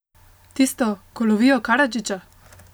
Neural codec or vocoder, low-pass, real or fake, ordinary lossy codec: none; none; real; none